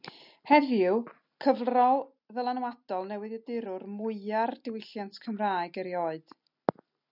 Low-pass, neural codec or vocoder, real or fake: 5.4 kHz; none; real